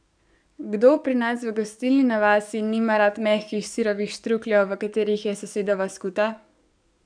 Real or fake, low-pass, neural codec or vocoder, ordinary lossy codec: fake; 9.9 kHz; codec, 44.1 kHz, 7.8 kbps, DAC; none